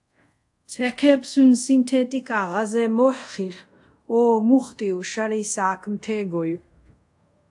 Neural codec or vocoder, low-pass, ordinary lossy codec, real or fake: codec, 24 kHz, 0.5 kbps, DualCodec; 10.8 kHz; AAC, 64 kbps; fake